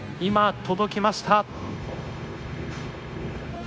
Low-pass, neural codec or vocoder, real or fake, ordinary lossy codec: none; codec, 16 kHz, 0.9 kbps, LongCat-Audio-Codec; fake; none